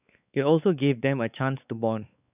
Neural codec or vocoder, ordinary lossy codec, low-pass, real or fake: codec, 16 kHz, 4 kbps, X-Codec, WavLM features, trained on Multilingual LibriSpeech; none; 3.6 kHz; fake